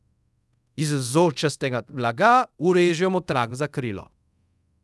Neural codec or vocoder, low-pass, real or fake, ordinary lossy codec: codec, 24 kHz, 0.5 kbps, DualCodec; none; fake; none